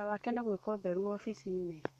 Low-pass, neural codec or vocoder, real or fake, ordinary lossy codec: 14.4 kHz; codec, 32 kHz, 1.9 kbps, SNAC; fake; MP3, 64 kbps